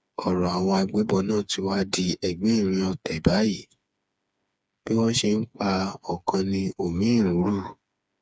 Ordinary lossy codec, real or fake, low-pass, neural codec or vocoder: none; fake; none; codec, 16 kHz, 4 kbps, FreqCodec, smaller model